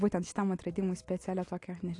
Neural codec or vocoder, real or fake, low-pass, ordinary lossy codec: none; real; 10.8 kHz; AAC, 48 kbps